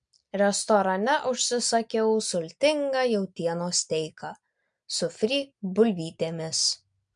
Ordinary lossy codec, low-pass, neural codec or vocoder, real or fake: MP3, 64 kbps; 9.9 kHz; none; real